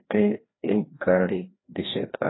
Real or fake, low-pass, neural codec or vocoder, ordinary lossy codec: fake; 7.2 kHz; codec, 16 kHz, 1 kbps, FreqCodec, larger model; AAC, 16 kbps